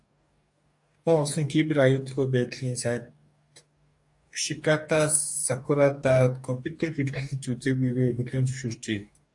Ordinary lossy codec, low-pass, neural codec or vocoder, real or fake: AAC, 64 kbps; 10.8 kHz; codec, 44.1 kHz, 2.6 kbps, DAC; fake